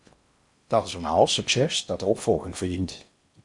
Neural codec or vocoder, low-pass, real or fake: codec, 16 kHz in and 24 kHz out, 0.6 kbps, FocalCodec, streaming, 4096 codes; 10.8 kHz; fake